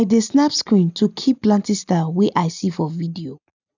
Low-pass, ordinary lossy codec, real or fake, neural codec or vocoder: 7.2 kHz; none; real; none